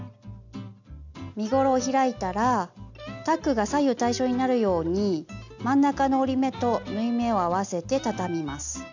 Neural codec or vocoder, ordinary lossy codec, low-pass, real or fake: none; none; 7.2 kHz; real